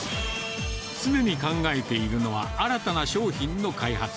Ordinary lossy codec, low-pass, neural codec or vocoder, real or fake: none; none; none; real